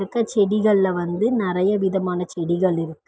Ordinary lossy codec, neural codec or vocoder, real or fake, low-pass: none; none; real; none